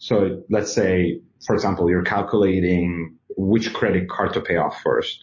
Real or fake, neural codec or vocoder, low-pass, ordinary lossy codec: real; none; 7.2 kHz; MP3, 32 kbps